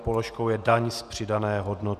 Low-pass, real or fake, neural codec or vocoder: 14.4 kHz; fake; vocoder, 48 kHz, 128 mel bands, Vocos